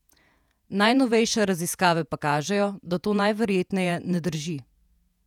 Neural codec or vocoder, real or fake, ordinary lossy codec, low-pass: vocoder, 48 kHz, 128 mel bands, Vocos; fake; none; 19.8 kHz